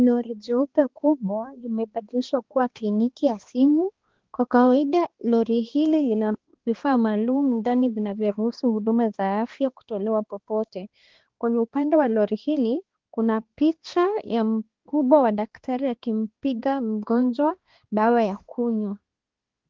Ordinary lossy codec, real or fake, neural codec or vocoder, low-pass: Opus, 16 kbps; fake; codec, 16 kHz, 2 kbps, X-Codec, HuBERT features, trained on balanced general audio; 7.2 kHz